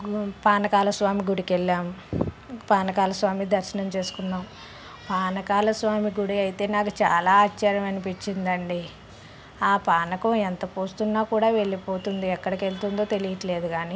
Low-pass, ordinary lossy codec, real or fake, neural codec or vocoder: none; none; real; none